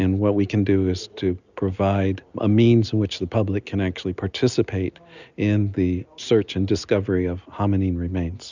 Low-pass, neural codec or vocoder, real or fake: 7.2 kHz; none; real